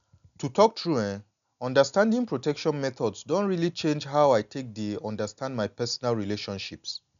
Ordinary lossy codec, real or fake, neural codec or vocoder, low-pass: none; real; none; 7.2 kHz